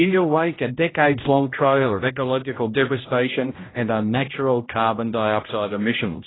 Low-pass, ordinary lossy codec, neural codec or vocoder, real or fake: 7.2 kHz; AAC, 16 kbps; codec, 16 kHz, 0.5 kbps, X-Codec, HuBERT features, trained on general audio; fake